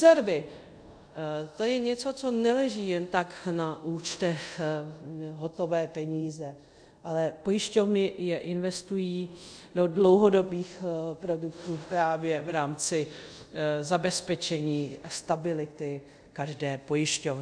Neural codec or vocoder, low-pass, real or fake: codec, 24 kHz, 0.5 kbps, DualCodec; 9.9 kHz; fake